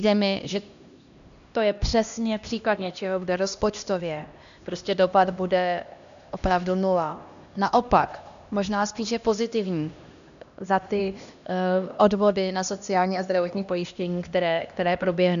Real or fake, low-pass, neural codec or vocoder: fake; 7.2 kHz; codec, 16 kHz, 1 kbps, X-Codec, HuBERT features, trained on LibriSpeech